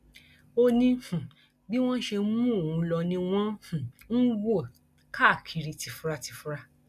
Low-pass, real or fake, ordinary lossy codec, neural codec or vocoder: 14.4 kHz; real; none; none